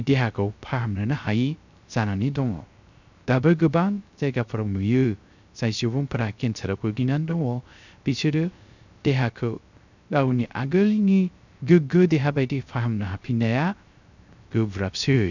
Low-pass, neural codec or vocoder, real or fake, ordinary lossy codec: 7.2 kHz; codec, 16 kHz, 0.3 kbps, FocalCodec; fake; none